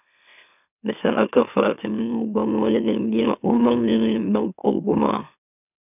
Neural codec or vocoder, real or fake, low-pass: autoencoder, 44.1 kHz, a latent of 192 numbers a frame, MeloTTS; fake; 3.6 kHz